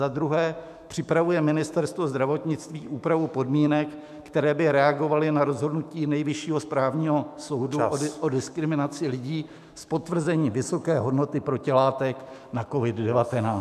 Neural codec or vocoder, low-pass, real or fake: autoencoder, 48 kHz, 128 numbers a frame, DAC-VAE, trained on Japanese speech; 14.4 kHz; fake